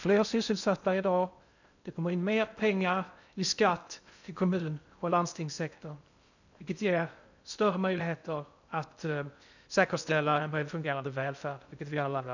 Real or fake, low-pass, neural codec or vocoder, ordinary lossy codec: fake; 7.2 kHz; codec, 16 kHz in and 24 kHz out, 0.6 kbps, FocalCodec, streaming, 2048 codes; none